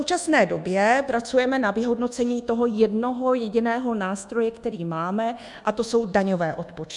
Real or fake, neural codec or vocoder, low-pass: fake; codec, 24 kHz, 1.2 kbps, DualCodec; 10.8 kHz